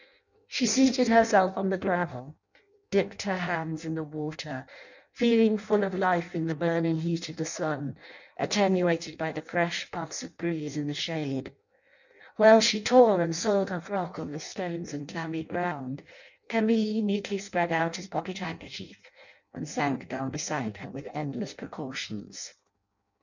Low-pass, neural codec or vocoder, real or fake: 7.2 kHz; codec, 16 kHz in and 24 kHz out, 0.6 kbps, FireRedTTS-2 codec; fake